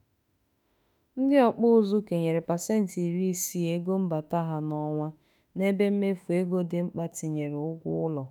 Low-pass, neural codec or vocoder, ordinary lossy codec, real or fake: none; autoencoder, 48 kHz, 32 numbers a frame, DAC-VAE, trained on Japanese speech; none; fake